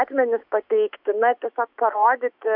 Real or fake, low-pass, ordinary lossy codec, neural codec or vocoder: fake; 5.4 kHz; MP3, 48 kbps; autoencoder, 48 kHz, 128 numbers a frame, DAC-VAE, trained on Japanese speech